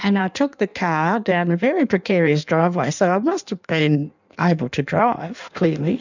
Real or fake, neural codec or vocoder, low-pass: fake; codec, 16 kHz in and 24 kHz out, 1.1 kbps, FireRedTTS-2 codec; 7.2 kHz